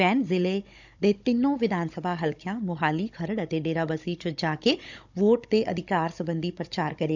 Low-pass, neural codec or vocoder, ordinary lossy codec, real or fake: 7.2 kHz; codec, 16 kHz, 16 kbps, FunCodec, trained on Chinese and English, 50 frames a second; none; fake